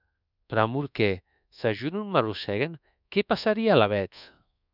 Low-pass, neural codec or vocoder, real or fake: 5.4 kHz; codec, 24 kHz, 1.2 kbps, DualCodec; fake